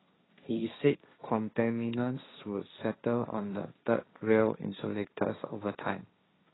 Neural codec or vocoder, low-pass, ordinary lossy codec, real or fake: codec, 16 kHz, 1.1 kbps, Voila-Tokenizer; 7.2 kHz; AAC, 16 kbps; fake